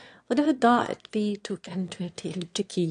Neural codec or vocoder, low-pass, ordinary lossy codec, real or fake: autoencoder, 22.05 kHz, a latent of 192 numbers a frame, VITS, trained on one speaker; 9.9 kHz; AAC, 48 kbps; fake